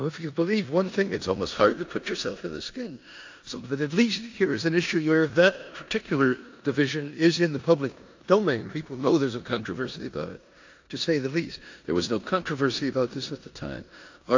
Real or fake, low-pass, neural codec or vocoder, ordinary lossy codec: fake; 7.2 kHz; codec, 16 kHz in and 24 kHz out, 0.9 kbps, LongCat-Audio-Codec, four codebook decoder; AAC, 48 kbps